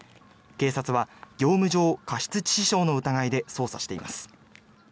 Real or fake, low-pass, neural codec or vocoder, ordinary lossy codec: real; none; none; none